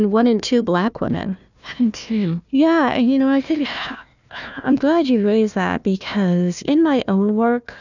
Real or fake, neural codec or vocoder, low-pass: fake; codec, 16 kHz, 1 kbps, FunCodec, trained on Chinese and English, 50 frames a second; 7.2 kHz